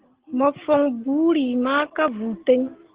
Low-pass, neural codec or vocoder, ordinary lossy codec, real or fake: 3.6 kHz; none; Opus, 16 kbps; real